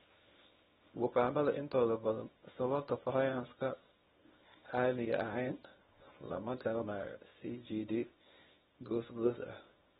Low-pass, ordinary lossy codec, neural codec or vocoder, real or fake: 10.8 kHz; AAC, 16 kbps; codec, 24 kHz, 0.9 kbps, WavTokenizer, small release; fake